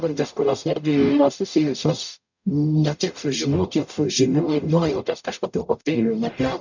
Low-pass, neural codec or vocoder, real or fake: 7.2 kHz; codec, 44.1 kHz, 0.9 kbps, DAC; fake